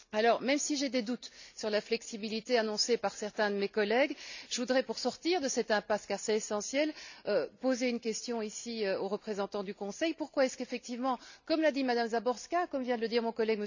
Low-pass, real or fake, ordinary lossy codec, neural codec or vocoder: 7.2 kHz; real; none; none